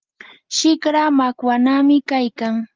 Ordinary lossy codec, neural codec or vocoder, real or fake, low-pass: Opus, 32 kbps; none; real; 7.2 kHz